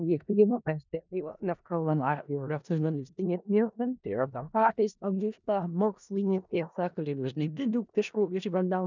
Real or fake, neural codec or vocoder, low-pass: fake; codec, 16 kHz in and 24 kHz out, 0.4 kbps, LongCat-Audio-Codec, four codebook decoder; 7.2 kHz